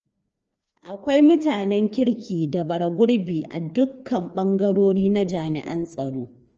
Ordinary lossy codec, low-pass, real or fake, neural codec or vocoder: Opus, 24 kbps; 7.2 kHz; fake; codec, 16 kHz, 2 kbps, FreqCodec, larger model